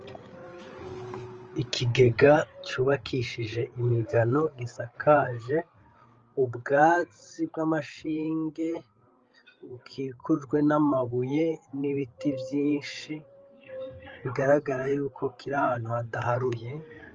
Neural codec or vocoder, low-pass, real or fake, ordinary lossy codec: codec, 16 kHz, 8 kbps, FreqCodec, larger model; 7.2 kHz; fake; Opus, 24 kbps